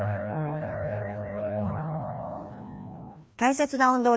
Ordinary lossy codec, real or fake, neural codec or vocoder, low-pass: none; fake; codec, 16 kHz, 1 kbps, FreqCodec, larger model; none